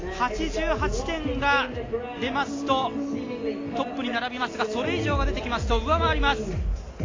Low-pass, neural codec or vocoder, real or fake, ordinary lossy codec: 7.2 kHz; none; real; AAC, 32 kbps